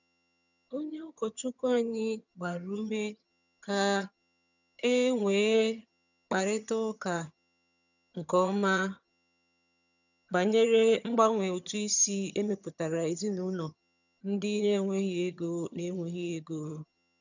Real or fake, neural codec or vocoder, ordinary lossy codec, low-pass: fake; vocoder, 22.05 kHz, 80 mel bands, HiFi-GAN; none; 7.2 kHz